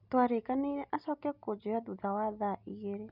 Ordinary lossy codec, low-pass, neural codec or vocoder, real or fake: none; 5.4 kHz; none; real